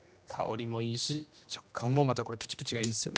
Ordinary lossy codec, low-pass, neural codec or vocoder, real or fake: none; none; codec, 16 kHz, 1 kbps, X-Codec, HuBERT features, trained on general audio; fake